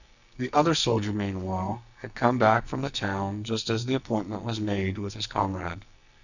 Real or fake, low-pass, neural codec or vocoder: fake; 7.2 kHz; codec, 44.1 kHz, 2.6 kbps, SNAC